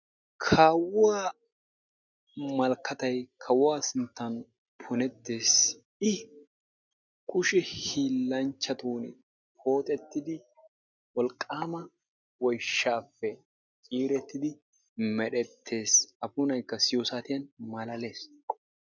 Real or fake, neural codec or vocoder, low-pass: real; none; 7.2 kHz